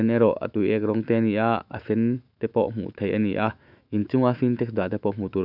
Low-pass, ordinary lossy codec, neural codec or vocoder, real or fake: 5.4 kHz; none; autoencoder, 48 kHz, 128 numbers a frame, DAC-VAE, trained on Japanese speech; fake